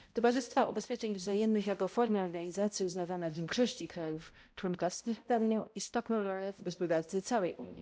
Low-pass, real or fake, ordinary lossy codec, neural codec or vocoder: none; fake; none; codec, 16 kHz, 0.5 kbps, X-Codec, HuBERT features, trained on balanced general audio